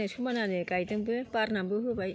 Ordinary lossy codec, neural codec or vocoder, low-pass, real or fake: none; none; none; real